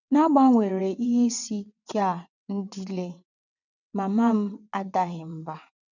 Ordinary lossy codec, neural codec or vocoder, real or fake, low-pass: none; vocoder, 22.05 kHz, 80 mel bands, WaveNeXt; fake; 7.2 kHz